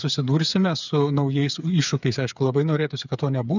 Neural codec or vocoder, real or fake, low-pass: codec, 16 kHz, 8 kbps, FreqCodec, smaller model; fake; 7.2 kHz